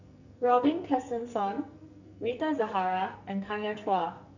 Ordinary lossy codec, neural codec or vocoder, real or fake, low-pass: none; codec, 44.1 kHz, 2.6 kbps, SNAC; fake; 7.2 kHz